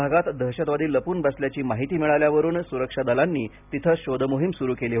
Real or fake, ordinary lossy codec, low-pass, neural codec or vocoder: real; none; 3.6 kHz; none